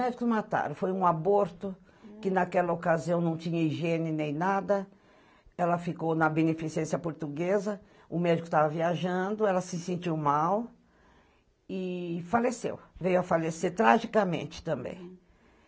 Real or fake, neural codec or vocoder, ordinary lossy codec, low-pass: real; none; none; none